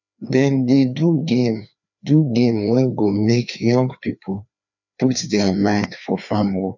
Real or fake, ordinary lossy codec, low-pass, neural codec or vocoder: fake; none; 7.2 kHz; codec, 16 kHz, 2 kbps, FreqCodec, larger model